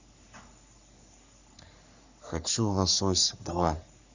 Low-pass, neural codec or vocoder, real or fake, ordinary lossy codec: 7.2 kHz; codec, 44.1 kHz, 3.4 kbps, Pupu-Codec; fake; Opus, 64 kbps